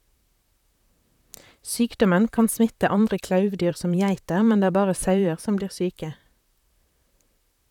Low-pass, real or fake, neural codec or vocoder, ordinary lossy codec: 19.8 kHz; fake; vocoder, 44.1 kHz, 128 mel bands, Pupu-Vocoder; none